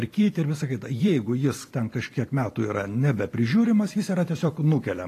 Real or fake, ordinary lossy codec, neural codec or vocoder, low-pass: fake; AAC, 48 kbps; vocoder, 44.1 kHz, 128 mel bands every 256 samples, BigVGAN v2; 14.4 kHz